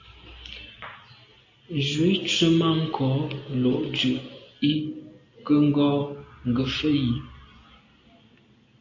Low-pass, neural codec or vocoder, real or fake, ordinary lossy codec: 7.2 kHz; none; real; AAC, 48 kbps